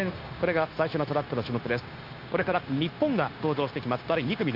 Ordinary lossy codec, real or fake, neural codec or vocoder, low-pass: Opus, 24 kbps; fake; codec, 16 kHz, 0.9 kbps, LongCat-Audio-Codec; 5.4 kHz